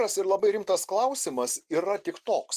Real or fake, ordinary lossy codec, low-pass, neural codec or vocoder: real; Opus, 24 kbps; 14.4 kHz; none